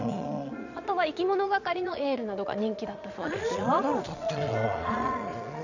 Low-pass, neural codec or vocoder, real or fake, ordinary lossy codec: 7.2 kHz; vocoder, 22.05 kHz, 80 mel bands, Vocos; fake; none